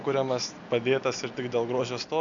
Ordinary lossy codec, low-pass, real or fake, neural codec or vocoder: MP3, 96 kbps; 7.2 kHz; real; none